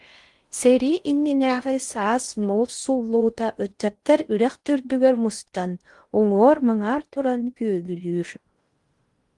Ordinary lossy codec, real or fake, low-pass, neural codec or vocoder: Opus, 24 kbps; fake; 10.8 kHz; codec, 16 kHz in and 24 kHz out, 0.6 kbps, FocalCodec, streaming, 4096 codes